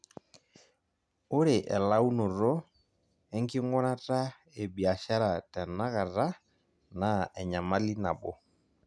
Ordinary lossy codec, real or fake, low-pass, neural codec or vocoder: none; real; none; none